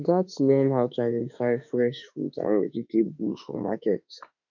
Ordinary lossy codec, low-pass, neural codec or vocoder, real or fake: none; 7.2 kHz; autoencoder, 48 kHz, 32 numbers a frame, DAC-VAE, trained on Japanese speech; fake